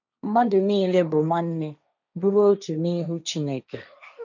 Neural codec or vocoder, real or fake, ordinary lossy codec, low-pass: codec, 16 kHz, 1.1 kbps, Voila-Tokenizer; fake; none; 7.2 kHz